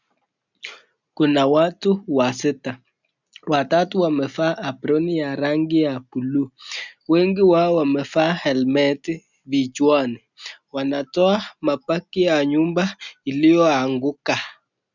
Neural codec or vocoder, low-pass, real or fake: none; 7.2 kHz; real